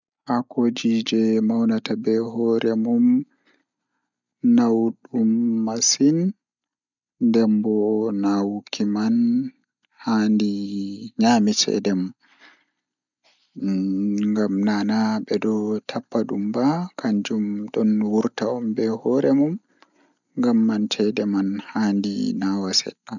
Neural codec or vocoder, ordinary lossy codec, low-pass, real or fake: none; none; 7.2 kHz; real